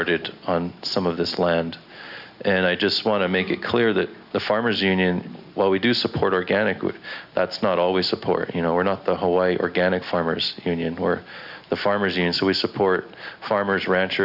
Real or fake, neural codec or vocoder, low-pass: real; none; 5.4 kHz